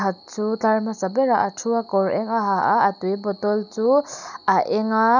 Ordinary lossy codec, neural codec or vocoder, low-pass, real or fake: none; none; 7.2 kHz; real